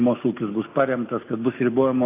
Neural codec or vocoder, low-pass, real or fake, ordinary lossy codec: none; 3.6 kHz; real; AAC, 24 kbps